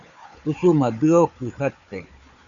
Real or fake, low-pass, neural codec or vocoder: fake; 7.2 kHz; codec, 16 kHz, 4 kbps, FunCodec, trained on Chinese and English, 50 frames a second